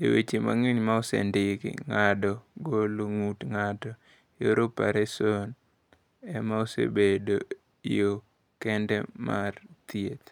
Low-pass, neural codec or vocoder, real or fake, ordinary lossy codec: 19.8 kHz; none; real; none